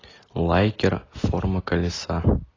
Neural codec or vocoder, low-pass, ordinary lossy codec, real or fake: none; 7.2 kHz; AAC, 32 kbps; real